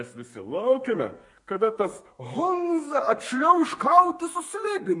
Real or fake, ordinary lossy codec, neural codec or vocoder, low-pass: fake; MP3, 48 kbps; codec, 32 kHz, 1.9 kbps, SNAC; 10.8 kHz